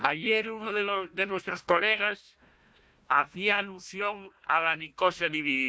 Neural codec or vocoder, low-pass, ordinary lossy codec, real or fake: codec, 16 kHz, 1 kbps, FunCodec, trained on Chinese and English, 50 frames a second; none; none; fake